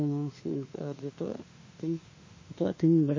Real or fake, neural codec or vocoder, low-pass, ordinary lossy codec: fake; autoencoder, 48 kHz, 32 numbers a frame, DAC-VAE, trained on Japanese speech; 7.2 kHz; MP3, 32 kbps